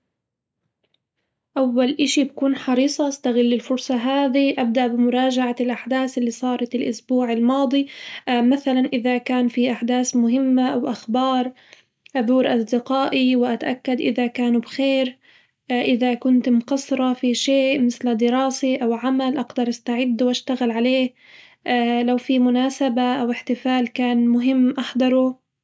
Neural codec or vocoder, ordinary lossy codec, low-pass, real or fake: none; none; none; real